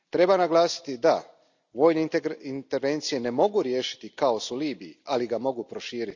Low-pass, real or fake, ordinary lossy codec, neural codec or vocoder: 7.2 kHz; real; none; none